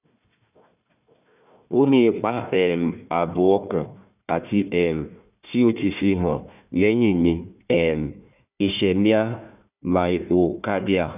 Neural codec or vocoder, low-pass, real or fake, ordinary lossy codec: codec, 16 kHz, 1 kbps, FunCodec, trained on Chinese and English, 50 frames a second; 3.6 kHz; fake; none